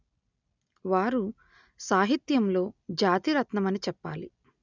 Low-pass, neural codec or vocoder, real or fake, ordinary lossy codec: 7.2 kHz; none; real; none